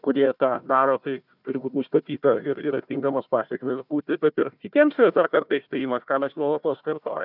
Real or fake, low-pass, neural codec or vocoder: fake; 5.4 kHz; codec, 16 kHz, 1 kbps, FunCodec, trained on Chinese and English, 50 frames a second